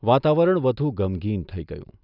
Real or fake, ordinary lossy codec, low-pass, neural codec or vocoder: real; none; 5.4 kHz; none